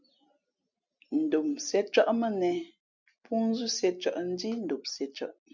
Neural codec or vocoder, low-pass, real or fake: none; 7.2 kHz; real